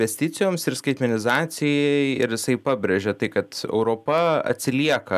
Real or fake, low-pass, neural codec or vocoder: real; 14.4 kHz; none